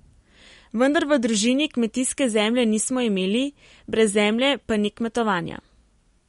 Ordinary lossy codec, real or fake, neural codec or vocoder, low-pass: MP3, 48 kbps; real; none; 10.8 kHz